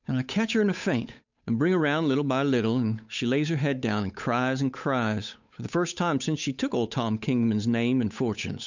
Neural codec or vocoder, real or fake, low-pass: codec, 16 kHz, 8 kbps, FunCodec, trained on Chinese and English, 25 frames a second; fake; 7.2 kHz